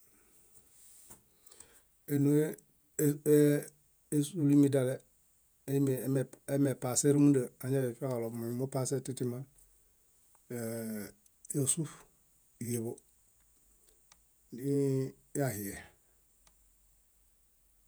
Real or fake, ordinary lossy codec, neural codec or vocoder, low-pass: fake; none; vocoder, 48 kHz, 128 mel bands, Vocos; none